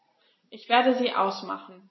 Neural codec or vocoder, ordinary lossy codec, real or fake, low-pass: none; MP3, 24 kbps; real; 7.2 kHz